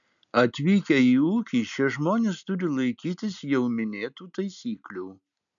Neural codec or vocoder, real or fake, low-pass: none; real; 7.2 kHz